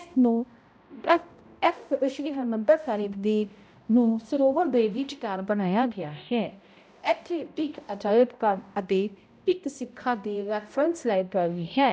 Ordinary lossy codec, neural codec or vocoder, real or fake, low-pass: none; codec, 16 kHz, 0.5 kbps, X-Codec, HuBERT features, trained on balanced general audio; fake; none